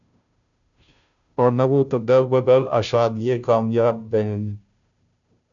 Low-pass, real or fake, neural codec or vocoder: 7.2 kHz; fake; codec, 16 kHz, 0.5 kbps, FunCodec, trained on Chinese and English, 25 frames a second